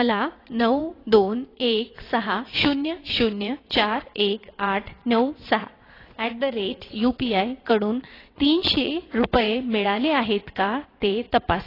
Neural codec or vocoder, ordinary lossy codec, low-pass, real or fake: vocoder, 22.05 kHz, 80 mel bands, WaveNeXt; AAC, 24 kbps; 5.4 kHz; fake